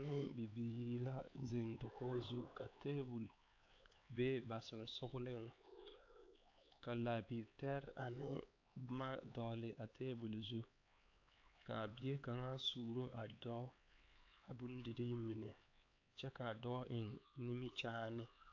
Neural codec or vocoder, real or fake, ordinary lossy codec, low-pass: codec, 16 kHz, 4 kbps, X-Codec, HuBERT features, trained on LibriSpeech; fake; AAC, 48 kbps; 7.2 kHz